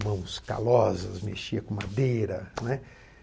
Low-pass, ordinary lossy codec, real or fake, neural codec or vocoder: none; none; real; none